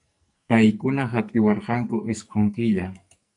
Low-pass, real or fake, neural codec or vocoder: 10.8 kHz; fake; codec, 44.1 kHz, 2.6 kbps, SNAC